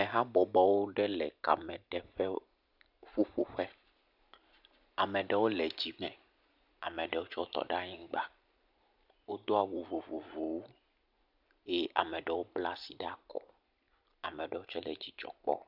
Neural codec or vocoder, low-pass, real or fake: none; 5.4 kHz; real